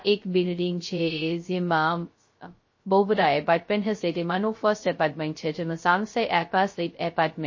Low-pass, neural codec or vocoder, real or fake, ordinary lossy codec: 7.2 kHz; codec, 16 kHz, 0.2 kbps, FocalCodec; fake; MP3, 32 kbps